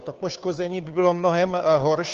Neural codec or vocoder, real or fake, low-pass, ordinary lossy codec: codec, 16 kHz, 2 kbps, FunCodec, trained on Chinese and English, 25 frames a second; fake; 7.2 kHz; Opus, 32 kbps